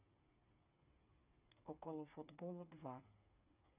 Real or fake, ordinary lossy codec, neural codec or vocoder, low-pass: fake; none; codec, 16 kHz, 8 kbps, FreqCodec, smaller model; 3.6 kHz